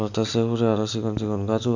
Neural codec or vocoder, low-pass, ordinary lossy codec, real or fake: none; 7.2 kHz; none; real